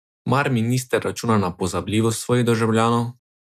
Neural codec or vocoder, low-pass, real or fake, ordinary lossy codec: none; 14.4 kHz; real; none